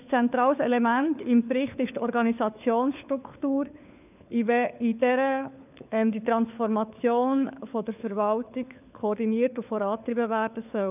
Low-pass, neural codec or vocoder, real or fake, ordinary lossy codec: 3.6 kHz; codec, 16 kHz, 4 kbps, FunCodec, trained on LibriTTS, 50 frames a second; fake; none